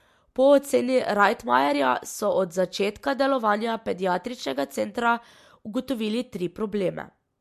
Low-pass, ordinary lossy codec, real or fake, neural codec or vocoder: 14.4 kHz; MP3, 64 kbps; real; none